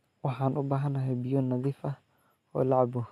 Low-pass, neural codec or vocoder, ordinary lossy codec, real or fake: 14.4 kHz; none; none; real